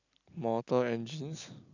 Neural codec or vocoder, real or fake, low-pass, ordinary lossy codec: none; real; 7.2 kHz; none